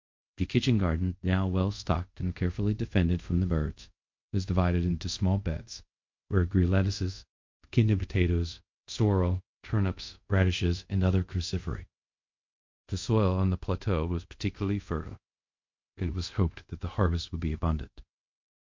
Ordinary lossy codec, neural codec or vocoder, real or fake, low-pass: MP3, 48 kbps; codec, 24 kHz, 0.5 kbps, DualCodec; fake; 7.2 kHz